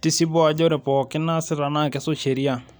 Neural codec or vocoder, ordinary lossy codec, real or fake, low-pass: none; none; real; none